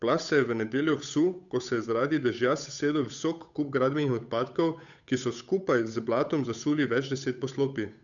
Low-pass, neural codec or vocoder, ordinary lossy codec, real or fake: 7.2 kHz; codec, 16 kHz, 8 kbps, FunCodec, trained on Chinese and English, 25 frames a second; none; fake